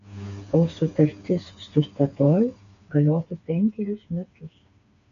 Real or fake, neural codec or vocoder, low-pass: fake; codec, 16 kHz, 4 kbps, FreqCodec, smaller model; 7.2 kHz